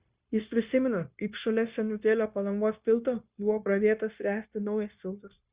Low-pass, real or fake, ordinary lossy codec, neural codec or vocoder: 3.6 kHz; fake; Opus, 64 kbps; codec, 16 kHz, 0.9 kbps, LongCat-Audio-Codec